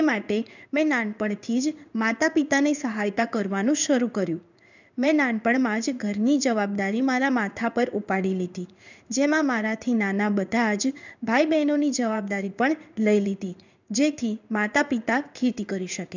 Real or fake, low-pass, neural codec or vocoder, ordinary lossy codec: fake; 7.2 kHz; codec, 16 kHz in and 24 kHz out, 1 kbps, XY-Tokenizer; none